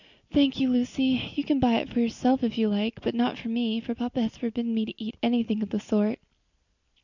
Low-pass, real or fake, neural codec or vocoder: 7.2 kHz; real; none